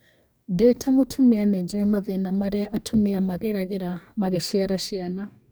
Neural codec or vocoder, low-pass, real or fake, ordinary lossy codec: codec, 44.1 kHz, 2.6 kbps, DAC; none; fake; none